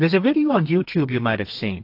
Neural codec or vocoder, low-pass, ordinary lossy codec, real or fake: codec, 32 kHz, 1.9 kbps, SNAC; 5.4 kHz; AAC, 32 kbps; fake